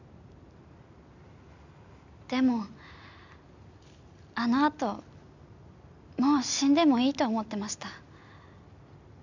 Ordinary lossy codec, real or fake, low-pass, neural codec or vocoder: none; real; 7.2 kHz; none